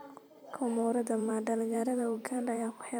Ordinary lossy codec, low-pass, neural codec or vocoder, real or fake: none; none; vocoder, 44.1 kHz, 128 mel bands every 512 samples, BigVGAN v2; fake